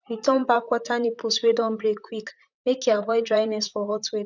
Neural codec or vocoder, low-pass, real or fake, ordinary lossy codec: vocoder, 44.1 kHz, 128 mel bands, Pupu-Vocoder; 7.2 kHz; fake; none